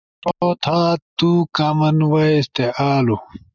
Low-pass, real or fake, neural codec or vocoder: 7.2 kHz; real; none